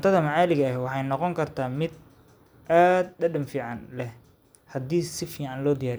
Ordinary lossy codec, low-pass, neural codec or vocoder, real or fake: none; none; none; real